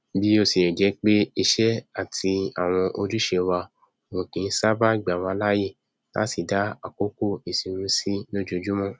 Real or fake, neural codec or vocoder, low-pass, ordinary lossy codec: real; none; none; none